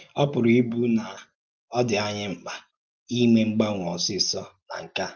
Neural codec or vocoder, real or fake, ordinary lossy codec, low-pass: none; real; Opus, 24 kbps; 7.2 kHz